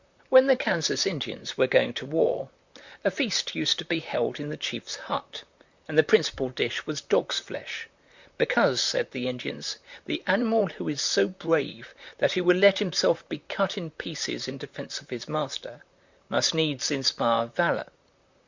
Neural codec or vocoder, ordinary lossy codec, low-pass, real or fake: vocoder, 44.1 kHz, 128 mel bands, Pupu-Vocoder; Opus, 64 kbps; 7.2 kHz; fake